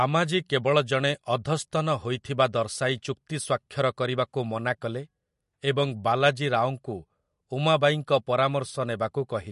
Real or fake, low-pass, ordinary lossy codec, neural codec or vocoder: real; 14.4 kHz; MP3, 48 kbps; none